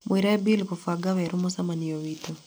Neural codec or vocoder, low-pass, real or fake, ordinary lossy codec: none; none; real; none